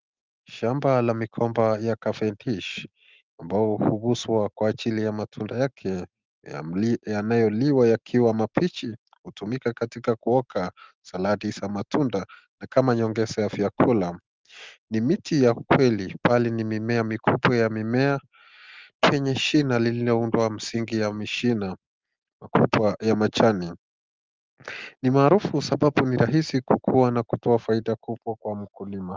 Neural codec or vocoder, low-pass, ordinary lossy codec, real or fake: none; 7.2 kHz; Opus, 32 kbps; real